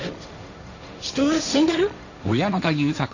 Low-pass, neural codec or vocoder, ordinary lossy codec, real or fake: 7.2 kHz; codec, 16 kHz, 1.1 kbps, Voila-Tokenizer; none; fake